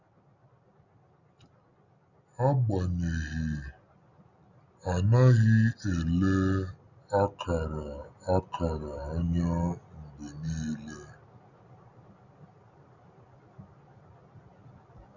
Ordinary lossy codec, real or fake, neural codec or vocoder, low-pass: none; real; none; 7.2 kHz